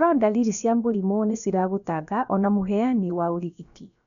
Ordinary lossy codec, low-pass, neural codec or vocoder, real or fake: none; 7.2 kHz; codec, 16 kHz, about 1 kbps, DyCAST, with the encoder's durations; fake